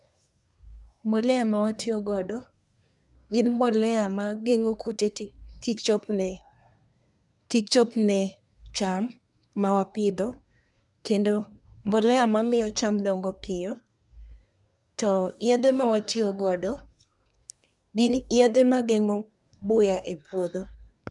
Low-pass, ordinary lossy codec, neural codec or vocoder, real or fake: 10.8 kHz; none; codec, 24 kHz, 1 kbps, SNAC; fake